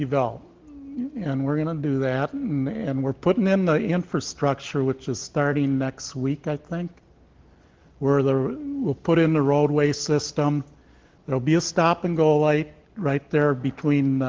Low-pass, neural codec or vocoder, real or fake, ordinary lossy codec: 7.2 kHz; none; real; Opus, 16 kbps